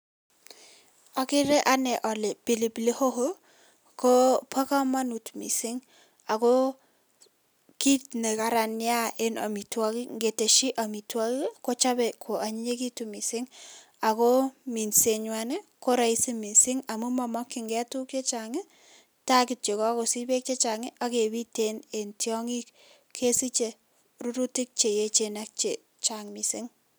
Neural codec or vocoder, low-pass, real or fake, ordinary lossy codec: none; none; real; none